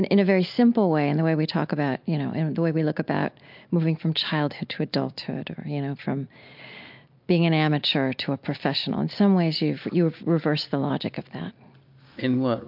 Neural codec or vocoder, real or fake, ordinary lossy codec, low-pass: none; real; MP3, 48 kbps; 5.4 kHz